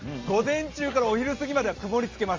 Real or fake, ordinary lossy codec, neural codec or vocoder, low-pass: real; Opus, 32 kbps; none; 7.2 kHz